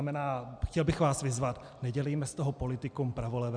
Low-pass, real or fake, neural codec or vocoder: 9.9 kHz; real; none